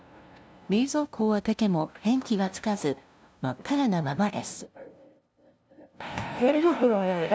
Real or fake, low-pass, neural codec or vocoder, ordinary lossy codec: fake; none; codec, 16 kHz, 0.5 kbps, FunCodec, trained on LibriTTS, 25 frames a second; none